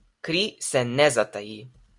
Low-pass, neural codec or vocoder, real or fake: 10.8 kHz; none; real